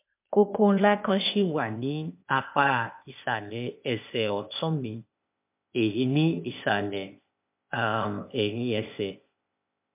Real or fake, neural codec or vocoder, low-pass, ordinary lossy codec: fake; codec, 16 kHz, 0.8 kbps, ZipCodec; 3.6 kHz; MP3, 32 kbps